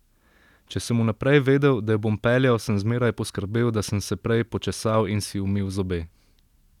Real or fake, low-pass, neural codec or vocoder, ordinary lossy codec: real; 19.8 kHz; none; none